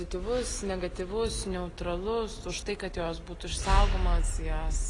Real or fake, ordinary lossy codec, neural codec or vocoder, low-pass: real; AAC, 32 kbps; none; 10.8 kHz